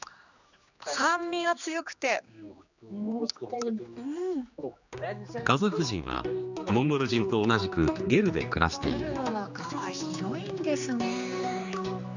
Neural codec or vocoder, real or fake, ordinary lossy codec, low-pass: codec, 16 kHz, 2 kbps, X-Codec, HuBERT features, trained on general audio; fake; none; 7.2 kHz